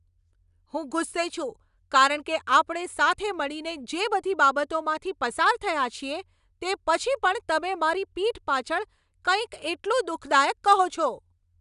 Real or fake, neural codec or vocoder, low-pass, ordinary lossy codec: real; none; 10.8 kHz; none